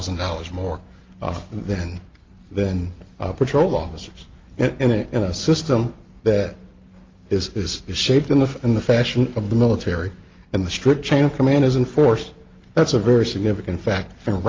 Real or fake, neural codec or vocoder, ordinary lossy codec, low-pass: real; none; Opus, 24 kbps; 7.2 kHz